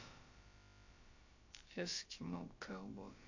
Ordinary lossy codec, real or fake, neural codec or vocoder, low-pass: AAC, 48 kbps; fake; codec, 16 kHz, about 1 kbps, DyCAST, with the encoder's durations; 7.2 kHz